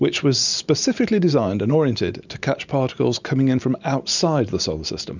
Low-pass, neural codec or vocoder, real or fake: 7.2 kHz; none; real